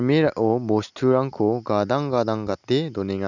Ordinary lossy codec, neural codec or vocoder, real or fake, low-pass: none; none; real; 7.2 kHz